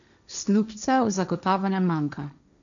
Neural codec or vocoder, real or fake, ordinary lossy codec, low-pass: codec, 16 kHz, 1.1 kbps, Voila-Tokenizer; fake; none; 7.2 kHz